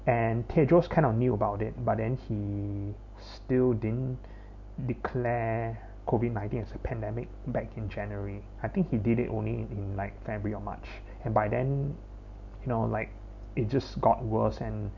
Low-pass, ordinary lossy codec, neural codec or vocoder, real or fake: 7.2 kHz; none; none; real